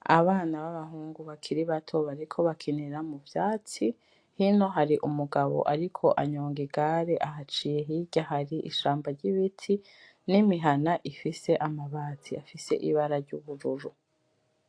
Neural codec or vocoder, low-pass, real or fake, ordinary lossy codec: none; 9.9 kHz; real; AAC, 48 kbps